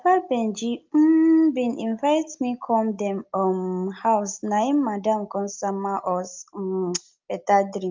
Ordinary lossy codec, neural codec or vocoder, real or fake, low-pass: Opus, 24 kbps; none; real; 7.2 kHz